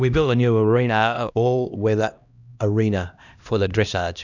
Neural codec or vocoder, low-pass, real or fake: codec, 16 kHz, 1 kbps, X-Codec, HuBERT features, trained on LibriSpeech; 7.2 kHz; fake